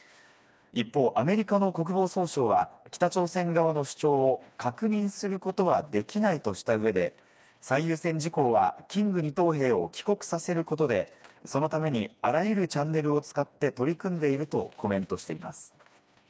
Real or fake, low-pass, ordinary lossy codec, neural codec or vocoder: fake; none; none; codec, 16 kHz, 2 kbps, FreqCodec, smaller model